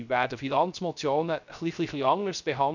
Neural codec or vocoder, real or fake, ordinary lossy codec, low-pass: codec, 16 kHz, 0.3 kbps, FocalCodec; fake; none; 7.2 kHz